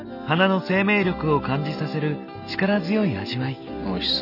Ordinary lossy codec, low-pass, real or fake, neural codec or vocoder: none; 5.4 kHz; real; none